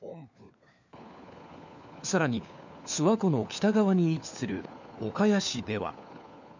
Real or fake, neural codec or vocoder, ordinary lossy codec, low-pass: fake; codec, 16 kHz, 4 kbps, FunCodec, trained on LibriTTS, 50 frames a second; none; 7.2 kHz